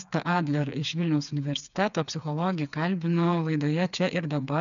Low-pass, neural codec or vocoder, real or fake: 7.2 kHz; codec, 16 kHz, 4 kbps, FreqCodec, smaller model; fake